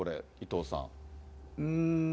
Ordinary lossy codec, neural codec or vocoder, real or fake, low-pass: none; none; real; none